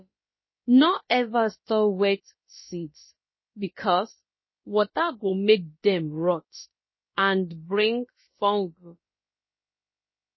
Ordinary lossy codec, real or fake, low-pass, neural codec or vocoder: MP3, 24 kbps; fake; 7.2 kHz; codec, 16 kHz, about 1 kbps, DyCAST, with the encoder's durations